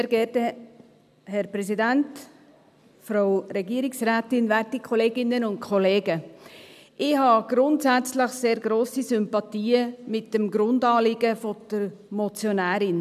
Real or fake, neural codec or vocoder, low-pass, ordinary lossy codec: real; none; 14.4 kHz; none